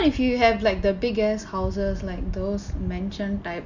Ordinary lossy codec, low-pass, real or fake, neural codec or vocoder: none; 7.2 kHz; real; none